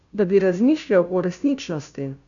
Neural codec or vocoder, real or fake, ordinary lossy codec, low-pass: codec, 16 kHz, 0.5 kbps, FunCodec, trained on Chinese and English, 25 frames a second; fake; none; 7.2 kHz